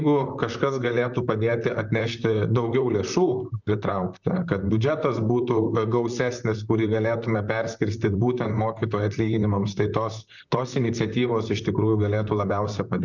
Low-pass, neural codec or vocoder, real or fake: 7.2 kHz; vocoder, 44.1 kHz, 128 mel bands, Pupu-Vocoder; fake